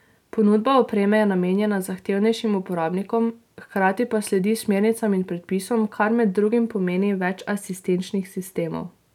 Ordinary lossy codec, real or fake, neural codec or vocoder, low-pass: none; real; none; 19.8 kHz